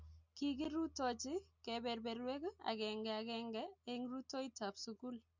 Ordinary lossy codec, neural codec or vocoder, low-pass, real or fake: Opus, 64 kbps; none; 7.2 kHz; real